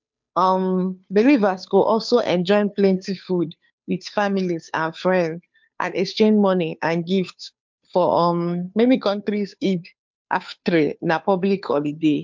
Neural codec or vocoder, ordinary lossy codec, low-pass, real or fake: codec, 16 kHz, 2 kbps, FunCodec, trained on Chinese and English, 25 frames a second; MP3, 64 kbps; 7.2 kHz; fake